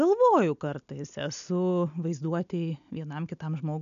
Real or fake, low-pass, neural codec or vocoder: real; 7.2 kHz; none